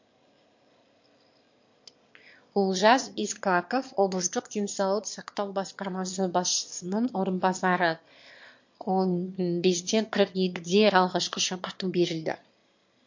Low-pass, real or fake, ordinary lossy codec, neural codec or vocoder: 7.2 kHz; fake; MP3, 48 kbps; autoencoder, 22.05 kHz, a latent of 192 numbers a frame, VITS, trained on one speaker